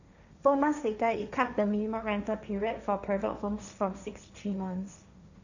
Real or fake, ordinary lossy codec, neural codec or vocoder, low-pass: fake; none; codec, 16 kHz, 1.1 kbps, Voila-Tokenizer; 7.2 kHz